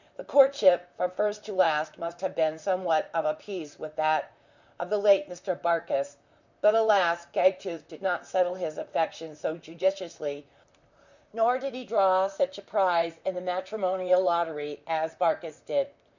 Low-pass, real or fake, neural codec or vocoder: 7.2 kHz; fake; vocoder, 44.1 kHz, 128 mel bands, Pupu-Vocoder